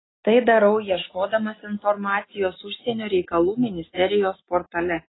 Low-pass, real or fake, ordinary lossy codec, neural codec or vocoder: 7.2 kHz; real; AAC, 16 kbps; none